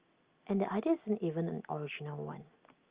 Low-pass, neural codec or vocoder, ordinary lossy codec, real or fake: 3.6 kHz; none; Opus, 32 kbps; real